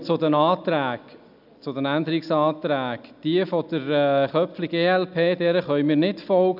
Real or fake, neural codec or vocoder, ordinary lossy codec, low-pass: real; none; none; 5.4 kHz